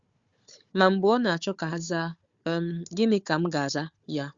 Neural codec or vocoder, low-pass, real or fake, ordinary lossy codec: codec, 16 kHz, 4 kbps, FunCodec, trained on Chinese and English, 50 frames a second; 7.2 kHz; fake; Opus, 64 kbps